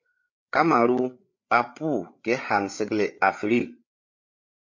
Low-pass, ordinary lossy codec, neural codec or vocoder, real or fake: 7.2 kHz; MP3, 48 kbps; codec, 16 kHz, 8 kbps, FreqCodec, larger model; fake